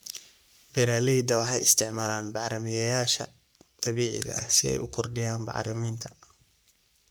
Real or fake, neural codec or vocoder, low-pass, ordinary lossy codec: fake; codec, 44.1 kHz, 3.4 kbps, Pupu-Codec; none; none